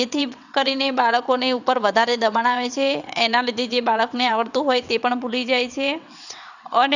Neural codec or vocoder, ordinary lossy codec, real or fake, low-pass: vocoder, 22.05 kHz, 80 mel bands, WaveNeXt; none; fake; 7.2 kHz